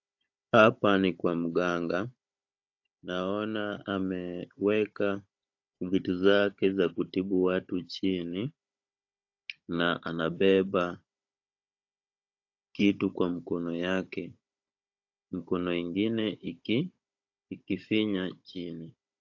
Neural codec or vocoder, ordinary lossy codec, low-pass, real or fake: codec, 16 kHz, 16 kbps, FunCodec, trained on Chinese and English, 50 frames a second; AAC, 48 kbps; 7.2 kHz; fake